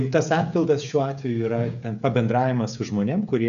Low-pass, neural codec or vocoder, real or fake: 7.2 kHz; codec, 16 kHz, 16 kbps, FreqCodec, smaller model; fake